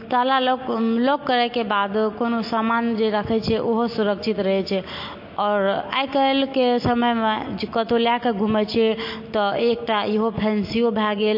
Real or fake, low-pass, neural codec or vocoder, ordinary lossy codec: real; 5.4 kHz; none; MP3, 32 kbps